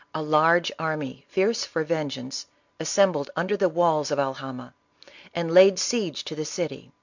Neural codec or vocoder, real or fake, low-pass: none; real; 7.2 kHz